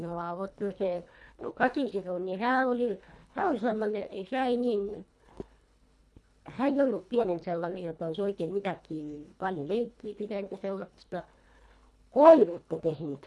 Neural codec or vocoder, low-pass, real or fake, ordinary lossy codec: codec, 24 kHz, 1.5 kbps, HILCodec; none; fake; none